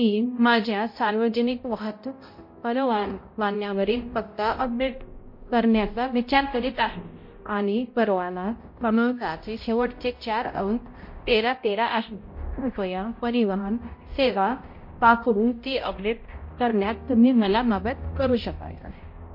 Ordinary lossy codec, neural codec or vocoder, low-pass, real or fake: MP3, 32 kbps; codec, 16 kHz, 0.5 kbps, X-Codec, HuBERT features, trained on balanced general audio; 5.4 kHz; fake